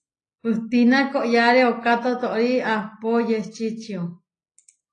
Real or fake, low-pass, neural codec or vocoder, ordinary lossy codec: real; 9.9 kHz; none; AAC, 32 kbps